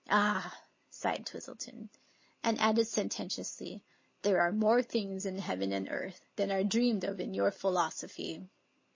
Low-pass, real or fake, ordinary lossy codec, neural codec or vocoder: 7.2 kHz; real; MP3, 32 kbps; none